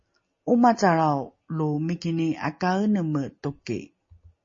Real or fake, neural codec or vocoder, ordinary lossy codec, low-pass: real; none; MP3, 32 kbps; 7.2 kHz